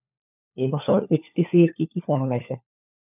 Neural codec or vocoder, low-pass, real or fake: codec, 16 kHz, 4 kbps, FunCodec, trained on LibriTTS, 50 frames a second; 3.6 kHz; fake